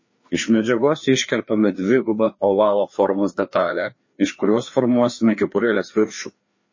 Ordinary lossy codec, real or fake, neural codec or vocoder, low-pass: MP3, 32 kbps; fake; codec, 16 kHz, 2 kbps, FreqCodec, larger model; 7.2 kHz